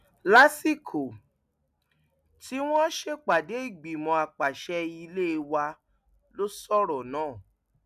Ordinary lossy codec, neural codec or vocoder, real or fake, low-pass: none; none; real; 14.4 kHz